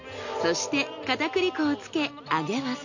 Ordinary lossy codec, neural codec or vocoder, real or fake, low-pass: none; none; real; 7.2 kHz